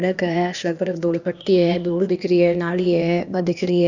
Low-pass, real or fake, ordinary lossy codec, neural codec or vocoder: 7.2 kHz; fake; none; codec, 16 kHz, 0.8 kbps, ZipCodec